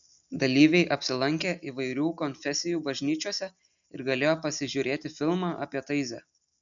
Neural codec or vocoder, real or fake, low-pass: none; real; 7.2 kHz